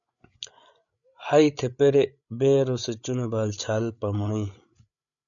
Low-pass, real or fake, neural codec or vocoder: 7.2 kHz; fake; codec, 16 kHz, 8 kbps, FreqCodec, larger model